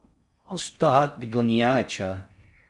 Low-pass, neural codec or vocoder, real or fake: 10.8 kHz; codec, 16 kHz in and 24 kHz out, 0.6 kbps, FocalCodec, streaming, 4096 codes; fake